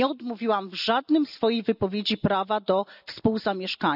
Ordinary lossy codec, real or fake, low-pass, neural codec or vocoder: none; real; 5.4 kHz; none